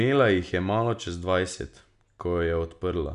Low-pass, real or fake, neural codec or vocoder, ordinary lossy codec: 10.8 kHz; real; none; none